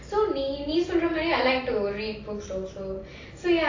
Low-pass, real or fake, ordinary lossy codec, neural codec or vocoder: 7.2 kHz; real; AAC, 32 kbps; none